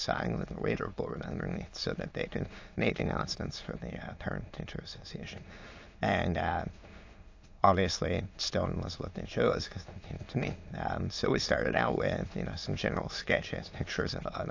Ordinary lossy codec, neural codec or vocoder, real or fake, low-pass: AAC, 48 kbps; autoencoder, 22.05 kHz, a latent of 192 numbers a frame, VITS, trained on many speakers; fake; 7.2 kHz